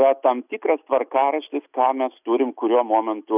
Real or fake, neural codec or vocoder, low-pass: real; none; 3.6 kHz